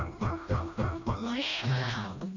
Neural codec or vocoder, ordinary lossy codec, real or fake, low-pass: codec, 16 kHz, 1 kbps, FreqCodec, smaller model; none; fake; 7.2 kHz